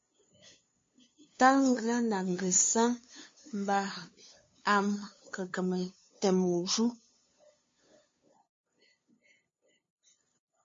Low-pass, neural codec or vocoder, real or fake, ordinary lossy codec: 7.2 kHz; codec, 16 kHz, 2 kbps, FunCodec, trained on LibriTTS, 25 frames a second; fake; MP3, 32 kbps